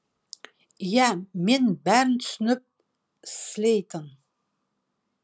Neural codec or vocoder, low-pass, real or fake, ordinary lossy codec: none; none; real; none